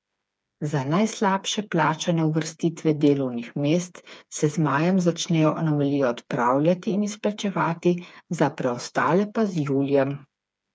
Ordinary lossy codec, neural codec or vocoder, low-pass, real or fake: none; codec, 16 kHz, 4 kbps, FreqCodec, smaller model; none; fake